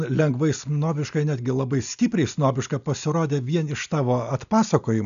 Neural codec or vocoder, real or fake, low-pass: none; real; 7.2 kHz